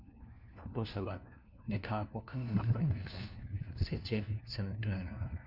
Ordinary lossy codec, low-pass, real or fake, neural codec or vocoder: none; 5.4 kHz; fake; codec, 16 kHz, 1 kbps, FunCodec, trained on LibriTTS, 50 frames a second